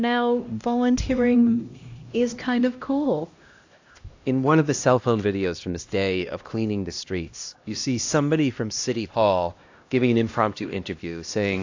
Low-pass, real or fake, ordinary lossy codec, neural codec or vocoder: 7.2 kHz; fake; AAC, 48 kbps; codec, 16 kHz, 1 kbps, X-Codec, HuBERT features, trained on LibriSpeech